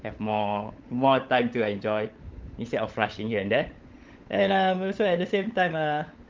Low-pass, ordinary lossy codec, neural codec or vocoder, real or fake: 7.2 kHz; Opus, 24 kbps; codec, 16 kHz, 16 kbps, FunCodec, trained on LibriTTS, 50 frames a second; fake